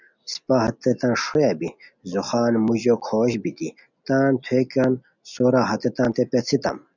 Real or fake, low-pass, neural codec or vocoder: real; 7.2 kHz; none